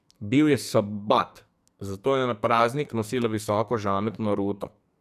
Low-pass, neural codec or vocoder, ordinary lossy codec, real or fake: 14.4 kHz; codec, 44.1 kHz, 2.6 kbps, SNAC; none; fake